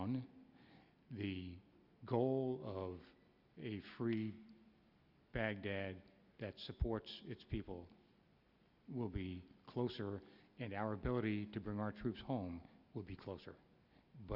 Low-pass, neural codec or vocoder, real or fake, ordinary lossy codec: 5.4 kHz; none; real; Opus, 64 kbps